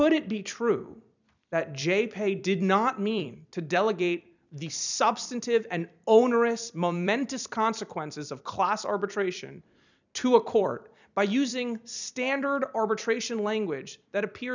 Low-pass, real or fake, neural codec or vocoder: 7.2 kHz; fake; vocoder, 44.1 kHz, 128 mel bands every 256 samples, BigVGAN v2